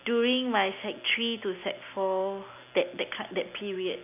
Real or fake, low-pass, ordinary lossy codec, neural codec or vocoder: real; 3.6 kHz; none; none